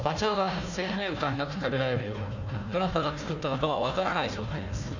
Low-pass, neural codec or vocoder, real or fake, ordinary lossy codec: 7.2 kHz; codec, 16 kHz, 1 kbps, FunCodec, trained on Chinese and English, 50 frames a second; fake; none